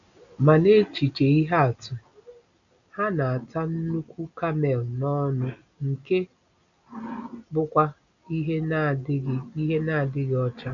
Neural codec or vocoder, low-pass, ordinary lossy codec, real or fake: none; 7.2 kHz; none; real